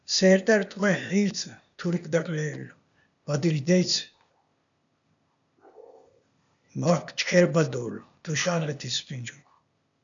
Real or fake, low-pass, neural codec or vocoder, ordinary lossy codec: fake; 7.2 kHz; codec, 16 kHz, 0.8 kbps, ZipCodec; MP3, 96 kbps